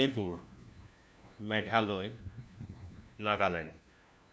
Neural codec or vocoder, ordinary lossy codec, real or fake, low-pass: codec, 16 kHz, 1 kbps, FunCodec, trained on LibriTTS, 50 frames a second; none; fake; none